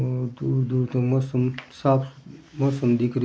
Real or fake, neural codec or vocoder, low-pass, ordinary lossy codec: real; none; none; none